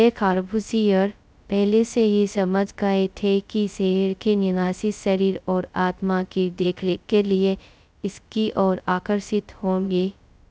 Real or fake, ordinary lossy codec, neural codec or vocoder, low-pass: fake; none; codec, 16 kHz, 0.2 kbps, FocalCodec; none